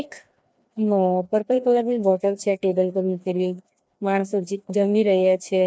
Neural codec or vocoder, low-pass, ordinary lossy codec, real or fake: codec, 16 kHz, 2 kbps, FreqCodec, larger model; none; none; fake